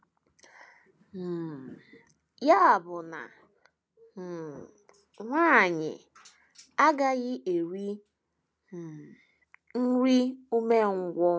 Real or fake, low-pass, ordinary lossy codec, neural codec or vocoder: real; none; none; none